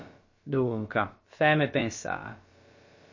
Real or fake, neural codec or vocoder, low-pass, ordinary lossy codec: fake; codec, 16 kHz, about 1 kbps, DyCAST, with the encoder's durations; 7.2 kHz; MP3, 32 kbps